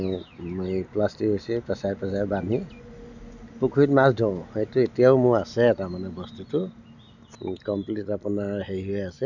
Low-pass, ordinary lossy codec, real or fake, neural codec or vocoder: 7.2 kHz; none; real; none